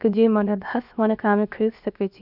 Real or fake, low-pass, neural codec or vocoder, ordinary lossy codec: fake; 5.4 kHz; codec, 16 kHz, about 1 kbps, DyCAST, with the encoder's durations; none